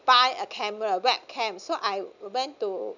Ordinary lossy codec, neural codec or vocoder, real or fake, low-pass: none; none; real; 7.2 kHz